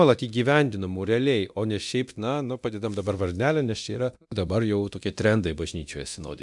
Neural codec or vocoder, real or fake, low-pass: codec, 24 kHz, 0.9 kbps, DualCodec; fake; 10.8 kHz